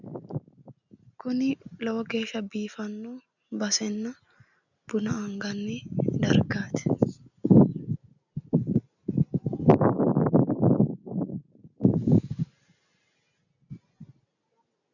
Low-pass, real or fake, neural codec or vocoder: 7.2 kHz; real; none